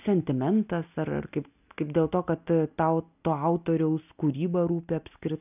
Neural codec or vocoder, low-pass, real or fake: none; 3.6 kHz; real